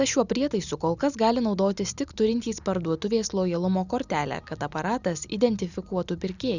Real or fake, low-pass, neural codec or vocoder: real; 7.2 kHz; none